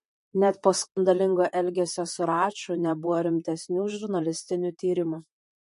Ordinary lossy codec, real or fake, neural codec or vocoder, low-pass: MP3, 48 kbps; fake; vocoder, 44.1 kHz, 128 mel bands, Pupu-Vocoder; 14.4 kHz